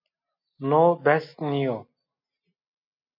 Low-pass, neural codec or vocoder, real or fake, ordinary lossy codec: 5.4 kHz; none; real; MP3, 32 kbps